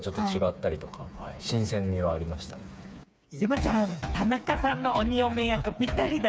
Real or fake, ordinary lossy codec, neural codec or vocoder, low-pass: fake; none; codec, 16 kHz, 4 kbps, FreqCodec, smaller model; none